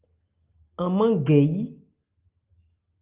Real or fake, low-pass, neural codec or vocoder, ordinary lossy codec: real; 3.6 kHz; none; Opus, 32 kbps